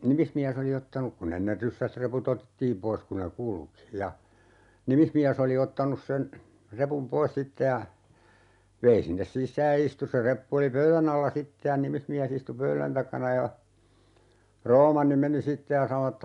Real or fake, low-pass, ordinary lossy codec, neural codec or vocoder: real; 10.8 kHz; none; none